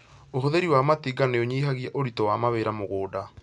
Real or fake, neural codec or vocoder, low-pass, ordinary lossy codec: real; none; 10.8 kHz; none